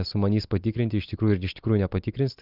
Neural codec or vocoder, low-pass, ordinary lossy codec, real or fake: none; 5.4 kHz; Opus, 24 kbps; real